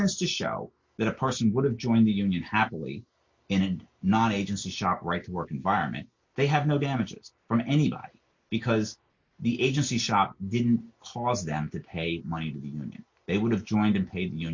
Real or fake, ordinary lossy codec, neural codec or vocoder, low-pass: real; MP3, 48 kbps; none; 7.2 kHz